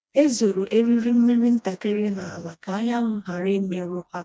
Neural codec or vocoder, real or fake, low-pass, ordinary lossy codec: codec, 16 kHz, 1 kbps, FreqCodec, smaller model; fake; none; none